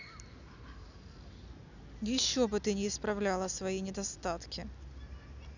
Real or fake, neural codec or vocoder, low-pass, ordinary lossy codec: real; none; 7.2 kHz; none